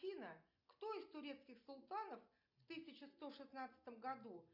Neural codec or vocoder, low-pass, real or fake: none; 5.4 kHz; real